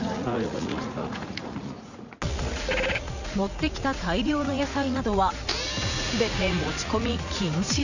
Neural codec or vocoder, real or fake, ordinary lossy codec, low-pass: vocoder, 44.1 kHz, 80 mel bands, Vocos; fake; none; 7.2 kHz